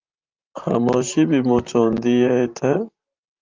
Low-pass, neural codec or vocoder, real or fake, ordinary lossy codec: 7.2 kHz; vocoder, 44.1 kHz, 128 mel bands, Pupu-Vocoder; fake; Opus, 32 kbps